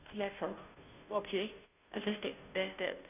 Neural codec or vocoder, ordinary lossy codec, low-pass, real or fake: codec, 16 kHz, 0.5 kbps, FunCodec, trained on Chinese and English, 25 frames a second; none; 3.6 kHz; fake